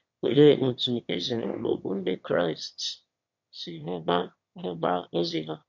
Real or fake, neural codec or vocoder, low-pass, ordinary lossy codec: fake; autoencoder, 22.05 kHz, a latent of 192 numbers a frame, VITS, trained on one speaker; 7.2 kHz; MP3, 48 kbps